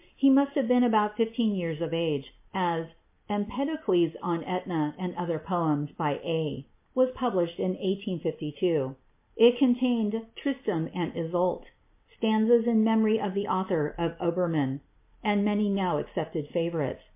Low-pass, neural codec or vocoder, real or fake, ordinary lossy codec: 3.6 kHz; none; real; MP3, 24 kbps